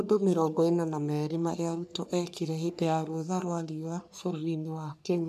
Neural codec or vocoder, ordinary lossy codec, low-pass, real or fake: codec, 44.1 kHz, 3.4 kbps, Pupu-Codec; none; 14.4 kHz; fake